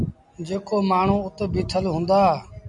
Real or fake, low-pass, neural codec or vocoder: real; 10.8 kHz; none